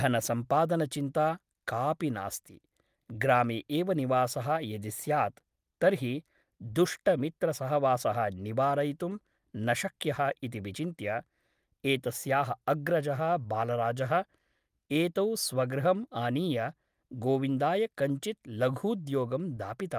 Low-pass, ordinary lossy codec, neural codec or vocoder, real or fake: 14.4 kHz; Opus, 32 kbps; none; real